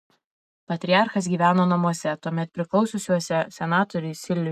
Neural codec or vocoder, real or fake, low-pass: none; real; 14.4 kHz